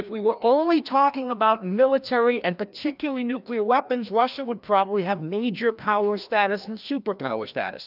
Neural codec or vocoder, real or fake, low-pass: codec, 16 kHz, 1 kbps, FreqCodec, larger model; fake; 5.4 kHz